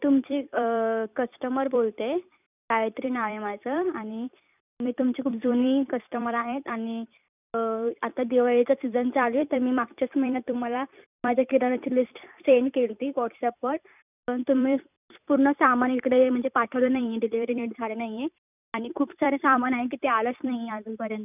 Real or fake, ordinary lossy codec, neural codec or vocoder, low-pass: fake; none; vocoder, 44.1 kHz, 128 mel bands every 256 samples, BigVGAN v2; 3.6 kHz